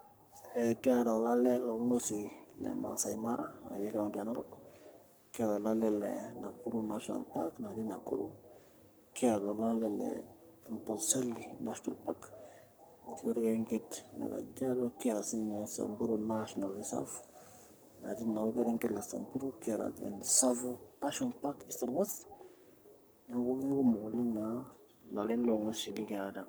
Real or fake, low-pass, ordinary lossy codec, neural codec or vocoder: fake; none; none; codec, 44.1 kHz, 3.4 kbps, Pupu-Codec